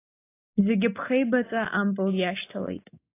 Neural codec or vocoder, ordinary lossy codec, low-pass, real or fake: none; AAC, 24 kbps; 3.6 kHz; real